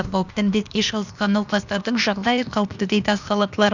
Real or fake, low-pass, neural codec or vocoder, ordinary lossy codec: fake; 7.2 kHz; codec, 16 kHz, 0.8 kbps, ZipCodec; none